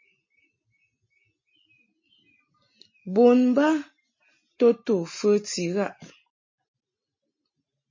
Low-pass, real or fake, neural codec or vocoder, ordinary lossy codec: 7.2 kHz; real; none; MP3, 32 kbps